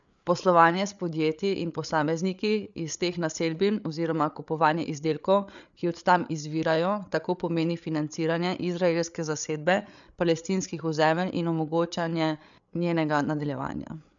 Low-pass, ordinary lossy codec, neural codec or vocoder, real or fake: 7.2 kHz; none; codec, 16 kHz, 8 kbps, FreqCodec, larger model; fake